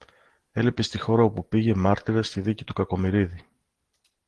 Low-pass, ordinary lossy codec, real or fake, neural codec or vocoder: 10.8 kHz; Opus, 16 kbps; real; none